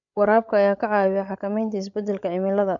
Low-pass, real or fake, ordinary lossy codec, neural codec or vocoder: 7.2 kHz; fake; none; codec, 16 kHz, 16 kbps, FreqCodec, larger model